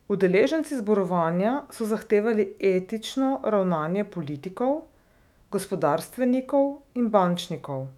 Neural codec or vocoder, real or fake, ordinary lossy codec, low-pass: autoencoder, 48 kHz, 128 numbers a frame, DAC-VAE, trained on Japanese speech; fake; none; 19.8 kHz